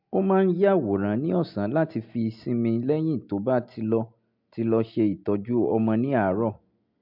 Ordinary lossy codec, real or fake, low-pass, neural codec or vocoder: MP3, 48 kbps; real; 5.4 kHz; none